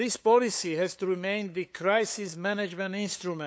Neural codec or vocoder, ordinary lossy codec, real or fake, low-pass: codec, 16 kHz, 8 kbps, FunCodec, trained on LibriTTS, 25 frames a second; none; fake; none